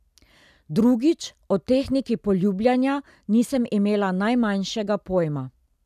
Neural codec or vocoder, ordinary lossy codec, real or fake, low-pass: none; none; real; 14.4 kHz